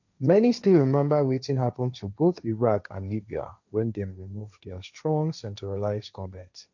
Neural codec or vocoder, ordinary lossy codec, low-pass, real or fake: codec, 16 kHz, 1.1 kbps, Voila-Tokenizer; none; 7.2 kHz; fake